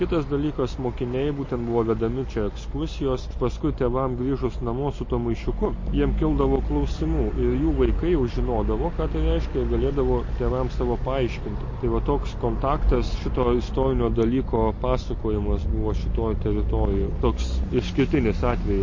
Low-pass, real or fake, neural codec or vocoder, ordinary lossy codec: 7.2 kHz; real; none; MP3, 32 kbps